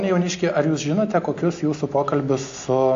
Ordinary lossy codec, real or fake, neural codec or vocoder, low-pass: AAC, 48 kbps; real; none; 7.2 kHz